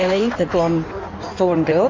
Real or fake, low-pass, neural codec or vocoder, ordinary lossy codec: fake; 7.2 kHz; codec, 16 kHz in and 24 kHz out, 1.1 kbps, FireRedTTS-2 codec; AAC, 48 kbps